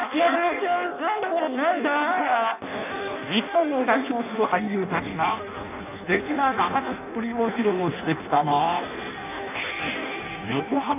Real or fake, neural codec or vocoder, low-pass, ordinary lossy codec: fake; codec, 16 kHz in and 24 kHz out, 0.6 kbps, FireRedTTS-2 codec; 3.6 kHz; none